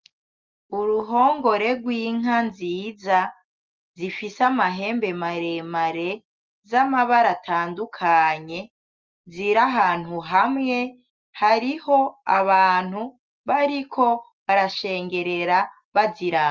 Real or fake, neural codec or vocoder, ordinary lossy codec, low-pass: real; none; Opus, 24 kbps; 7.2 kHz